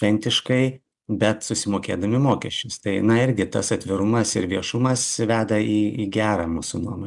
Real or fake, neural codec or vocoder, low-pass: real; none; 10.8 kHz